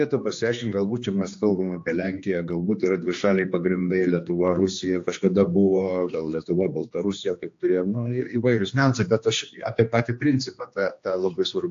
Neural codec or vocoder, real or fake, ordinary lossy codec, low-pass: codec, 16 kHz, 2 kbps, X-Codec, HuBERT features, trained on general audio; fake; AAC, 48 kbps; 7.2 kHz